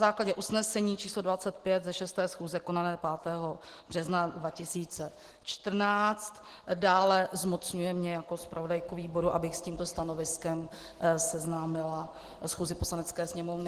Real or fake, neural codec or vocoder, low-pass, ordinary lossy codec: real; none; 14.4 kHz; Opus, 16 kbps